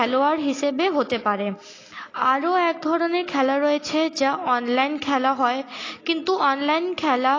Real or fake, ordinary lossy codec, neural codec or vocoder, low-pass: real; AAC, 32 kbps; none; 7.2 kHz